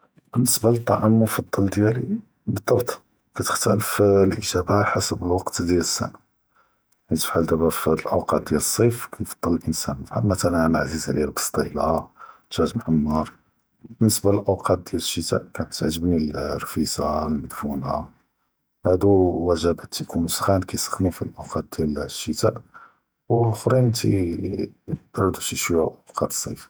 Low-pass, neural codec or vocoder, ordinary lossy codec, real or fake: none; vocoder, 48 kHz, 128 mel bands, Vocos; none; fake